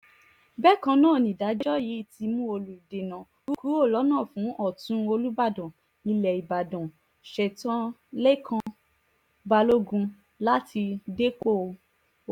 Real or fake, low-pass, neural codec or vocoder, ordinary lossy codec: real; 19.8 kHz; none; none